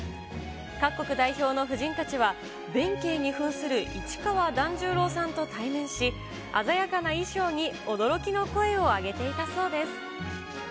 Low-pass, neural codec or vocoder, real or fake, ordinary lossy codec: none; none; real; none